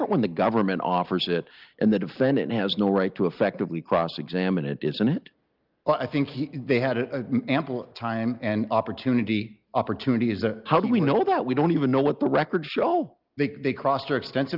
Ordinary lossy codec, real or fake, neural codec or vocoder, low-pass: Opus, 32 kbps; real; none; 5.4 kHz